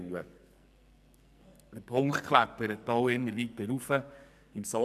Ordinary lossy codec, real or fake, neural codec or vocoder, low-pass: none; fake; codec, 44.1 kHz, 2.6 kbps, SNAC; 14.4 kHz